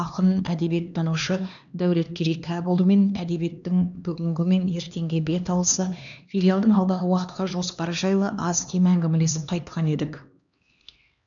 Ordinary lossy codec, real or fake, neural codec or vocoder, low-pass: none; fake; codec, 16 kHz, 2 kbps, X-Codec, HuBERT features, trained on LibriSpeech; 7.2 kHz